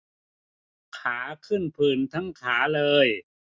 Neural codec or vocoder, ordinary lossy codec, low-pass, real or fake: none; none; none; real